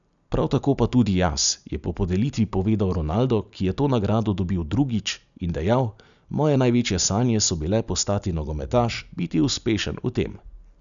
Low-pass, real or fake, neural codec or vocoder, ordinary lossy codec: 7.2 kHz; real; none; none